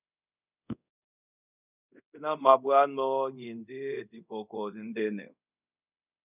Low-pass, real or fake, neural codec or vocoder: 3.6 kHz; fake; codec, 24 kHz, 0.5 kbps, DualCodec